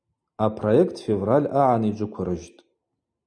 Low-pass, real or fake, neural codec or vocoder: 9.9 kHz; real; none